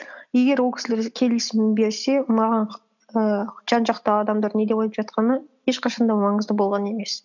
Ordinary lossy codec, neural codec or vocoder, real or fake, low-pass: none; none; real; 7.2 kHz